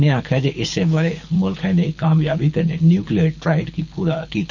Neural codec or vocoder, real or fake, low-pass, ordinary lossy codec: codec, 24 kHz, 6 kbps, HILCodec; fake; 7.2 kHz; none